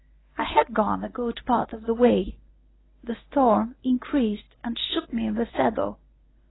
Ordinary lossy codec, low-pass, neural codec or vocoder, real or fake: AAC, 16 kbps; 7.2 kHz; codec, 24 kHz, 0.9 kbps, WavTokenizer, medium speech release version 1; fake